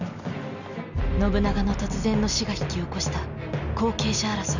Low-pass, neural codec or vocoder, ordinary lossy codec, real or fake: 7.2 kHz; none; none; real